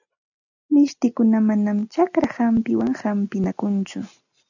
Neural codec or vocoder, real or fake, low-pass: none; real; 7.2 kHz